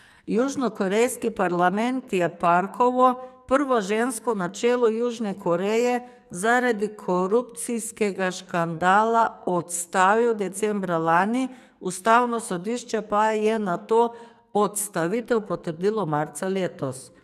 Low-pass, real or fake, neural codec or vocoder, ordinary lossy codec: 14.4 kHz; fake; codec, 44.1 kHz, 2.6 kbps, SNAC; none